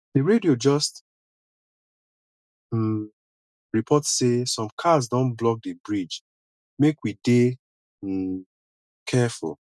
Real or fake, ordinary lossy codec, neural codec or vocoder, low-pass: real; none; none; none